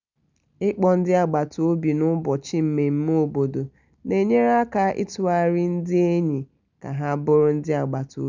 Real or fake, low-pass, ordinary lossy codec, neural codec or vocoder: real; 7.2 kHz; none; none